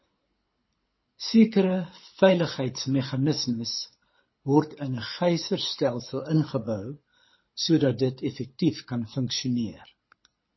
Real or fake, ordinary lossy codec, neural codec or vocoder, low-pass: fake; MP3, 24 kbps; codec, 24 kHz, 6 kbps, HILCodec; 7.2 kHz